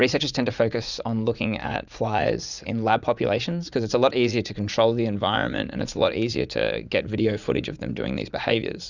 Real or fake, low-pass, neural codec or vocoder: fake; 7.2 kHz; vocoder, 44.1 kHz, 80 mel bands, Vocos